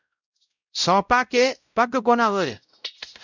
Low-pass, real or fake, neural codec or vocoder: 7.2 kHz; fake; codec, 16 kHz, 0.5 kbps, X-Codec, WavLM features, trained on Multilingual LibriSpeech